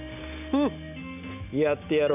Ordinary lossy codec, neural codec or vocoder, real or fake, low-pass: AAC, 32 kbps; none; real; 3.6 kHz